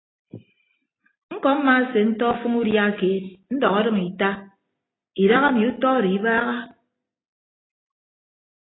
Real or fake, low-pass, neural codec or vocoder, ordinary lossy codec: real; 7.2 kHz; none; AAC, 16 kbps